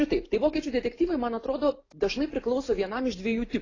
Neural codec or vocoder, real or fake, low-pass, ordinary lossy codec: none; real; 7.2 kHz; AAC, 32 kbps